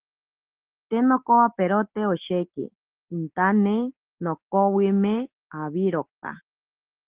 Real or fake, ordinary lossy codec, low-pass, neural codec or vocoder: real; Opus, 16 kbps; 3.6 kHz; none